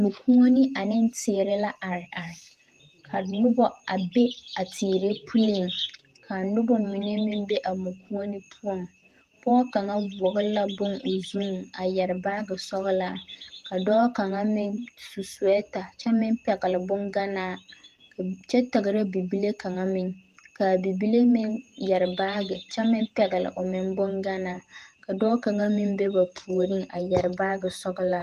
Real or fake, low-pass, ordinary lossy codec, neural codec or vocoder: fake; 14.4 kHz; Opus, 24 kbps; vocoder, 48 kHz, 128 mel bands, Vocos